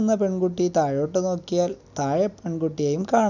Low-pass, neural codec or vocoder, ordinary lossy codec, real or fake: 7.2 kHz; none; none; real